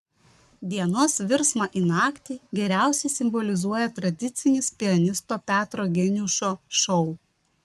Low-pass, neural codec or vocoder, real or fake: 14.4 kHz; codec, 44.1 kHz, 7.8 kbps, Pupu-Codec; fake